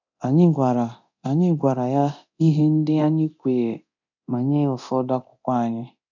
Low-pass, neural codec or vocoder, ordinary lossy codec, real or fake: 7.2 kHz; codec, 24 kHz, 0.9 kbps, DualCodec; none; fake